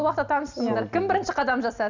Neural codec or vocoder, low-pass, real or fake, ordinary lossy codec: none; 7.2 kHz; real; none